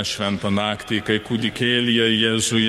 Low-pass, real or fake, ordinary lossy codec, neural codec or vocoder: 19.8 kHz; fake; MP3, 64 kbps; codec, 44.1 kHz, 7.8 kbps, DAC